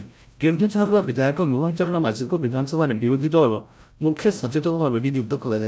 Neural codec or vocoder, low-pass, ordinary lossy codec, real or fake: codec, 16 kHz, 0.5 kbps, FreqCodec, larger model; none; none; fake